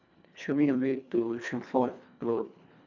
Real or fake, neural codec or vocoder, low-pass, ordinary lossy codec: fake; codec, 24 kHz, 1.5 kbps, HILCodec; 7.2 kHz; none